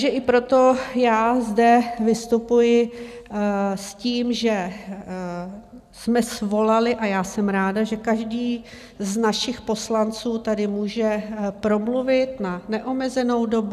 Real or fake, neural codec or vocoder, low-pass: real; none; 14.4 kHz